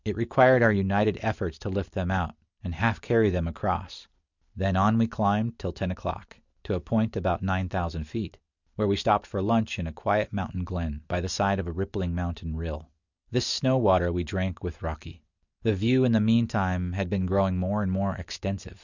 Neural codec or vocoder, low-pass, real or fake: none; 7.2 kHz; real